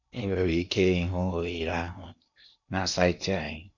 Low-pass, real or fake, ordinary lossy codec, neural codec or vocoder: 7.2 kHz; fake; none; codec, 16 kHz in and 24 kHz out, 0.8 kbps, FocalCodec, streaming, 65536 codes